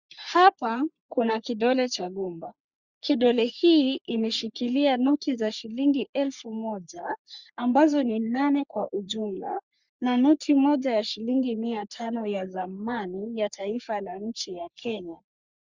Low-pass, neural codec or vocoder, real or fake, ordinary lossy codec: 7.2 kHz; codec, 44.1 kHz, 3.4 kbps, Pupu-Codec; fake; Opus, 64 kbps